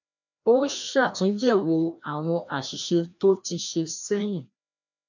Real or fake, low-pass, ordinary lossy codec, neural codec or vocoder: fake; 7.2 kHz; none; codec, 16 kHz, 1 kbps, FreqCodec, larger model